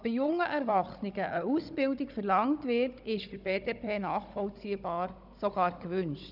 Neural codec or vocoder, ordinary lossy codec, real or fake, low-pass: codec, 16 kHz, 16 kbps, FunCodec, trained on Chinese and English, 50 frames a second; none; fake; 5.4 kHz